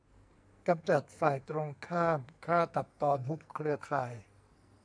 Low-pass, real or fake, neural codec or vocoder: 9.9 kHz; fake; codec, 44.1 kHz, 2.6 kbps, SNAC